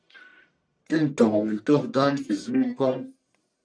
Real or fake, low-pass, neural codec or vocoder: fake; 9.9 kHz; codec, 44.1 kHz, 1.7 kbps, Pupu-Codec